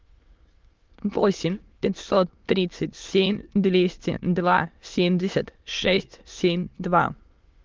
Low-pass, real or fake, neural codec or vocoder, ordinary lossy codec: 7.2 kHz; fake; autoencoder, 22.05 kHz, a latent of 192 numbers a frame, VITS, trained on many speakers; Opus, 32 kbps